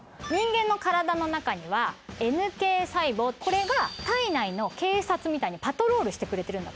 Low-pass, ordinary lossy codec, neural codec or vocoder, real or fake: none; none; none; real